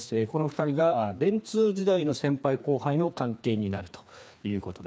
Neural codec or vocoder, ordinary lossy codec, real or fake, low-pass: codec, 16 kHz, 2 kbps, FreqCodec, larger model; none; fake; none